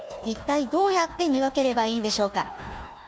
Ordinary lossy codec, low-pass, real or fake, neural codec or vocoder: none; none; fake; codec, 16 kHz, 1 kbps, FunCodec, trained on Chinese and English, 50 frames a second